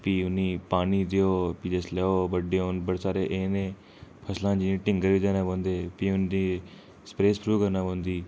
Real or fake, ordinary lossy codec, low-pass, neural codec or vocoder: real; none; none; none